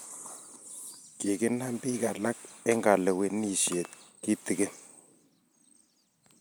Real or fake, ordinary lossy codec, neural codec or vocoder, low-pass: real; none; none; none